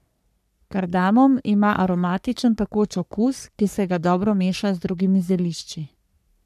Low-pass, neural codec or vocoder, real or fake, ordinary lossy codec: 14.4 kHz; codec, 44.1 kHz, 3.4 kbps, Pupu-Codec; fake; none